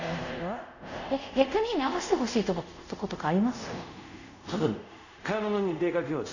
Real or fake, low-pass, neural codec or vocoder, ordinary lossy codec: fake; 7.2 kHz; codec, 24 kHz, 0.5 kbps, DualCodec; none